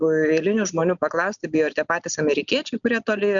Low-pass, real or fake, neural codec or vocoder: 7.2 kHz; real; none